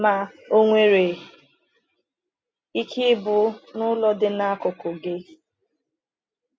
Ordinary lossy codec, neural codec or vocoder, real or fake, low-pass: none; none; real; none